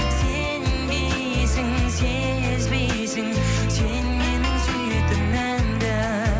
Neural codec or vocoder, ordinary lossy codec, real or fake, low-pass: none; none; real; none